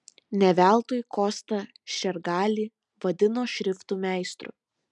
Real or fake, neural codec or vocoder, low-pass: real; none; 10.8 kHz